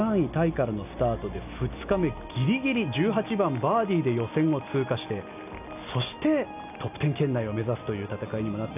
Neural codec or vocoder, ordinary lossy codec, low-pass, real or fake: none; none; 3.6 kHz; real